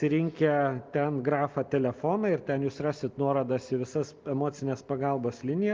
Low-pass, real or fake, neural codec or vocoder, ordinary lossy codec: 7.2 kHz; real; none; Opus, 32 kbps